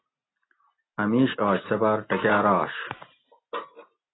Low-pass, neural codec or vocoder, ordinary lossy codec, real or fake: 7.2 kHz; none; AAC, 16 kbps; real